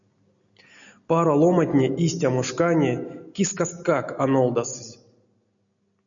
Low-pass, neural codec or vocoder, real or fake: 7.2 kHz; none; real